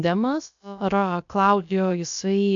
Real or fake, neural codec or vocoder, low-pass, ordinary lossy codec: fake; codec, 16 kHz, about 1 kbps, DyCAST, with the encoder's durations; 7.2 kHz; AAC, 64 kbps